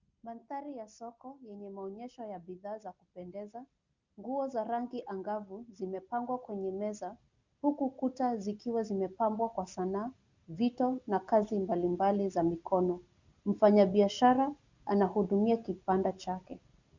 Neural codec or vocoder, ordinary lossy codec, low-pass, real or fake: none; Opus, 64 kbps; 7.2 kHz; real